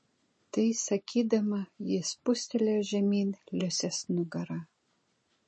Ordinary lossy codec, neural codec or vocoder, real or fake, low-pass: MP3, 32 kbps; none; real; 10.8 kHz